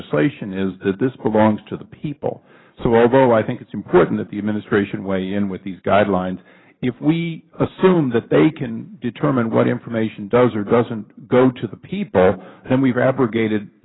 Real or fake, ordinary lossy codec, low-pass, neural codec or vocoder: fake; AAC, 16 kbps; 7.2 kHz; vocoder, 44.1 kHz, 128 mel bands every 512 samples, BigVGAN v2